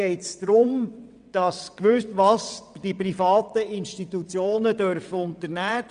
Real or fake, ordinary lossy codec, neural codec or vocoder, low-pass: fake; none; vocoder, 22.05 kHz, 80 mel bands, WaveNeXt; 9.9 kHz